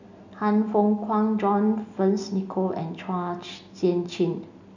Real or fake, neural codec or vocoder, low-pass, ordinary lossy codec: real; none; 7.2 kHz; none